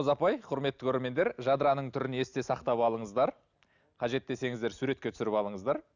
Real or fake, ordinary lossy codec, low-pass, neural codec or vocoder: real; none; 7.2 kHz; none